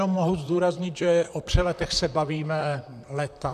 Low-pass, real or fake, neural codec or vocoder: 14.4 kHz; fake; vocoder, 44.1 kHz, 128 mel bands, Pupu-Vocoder